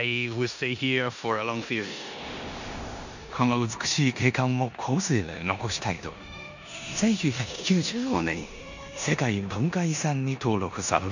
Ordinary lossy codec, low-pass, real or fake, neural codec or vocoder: none; 7.2 kHz; fake; codec, 16 kHz in and 24 kHz out, 0.9 kbps, LongCat-Audio-Codec, four codebook decoder